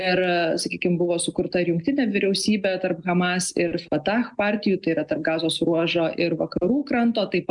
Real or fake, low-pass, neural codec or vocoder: real; 10.8 kHz; none